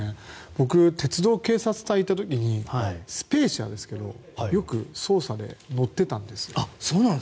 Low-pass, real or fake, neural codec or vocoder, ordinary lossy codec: none; real; none; none